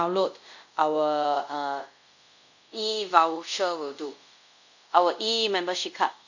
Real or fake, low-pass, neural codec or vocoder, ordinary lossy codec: fake; 7.2 kHz; codec, 24 kHz, 0.5 kbps, DualCodec; none